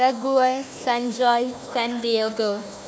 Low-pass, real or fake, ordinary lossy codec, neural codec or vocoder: none; fake; none; codec, 16 kHz, 1 kbps, FunCodec, trained on Chinese and English, 50 frames a second